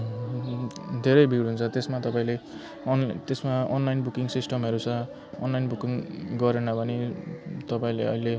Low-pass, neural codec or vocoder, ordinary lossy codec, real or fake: none; none; none; real